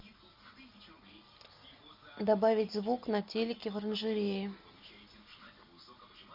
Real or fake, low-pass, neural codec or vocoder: real; 5.4 kHz; none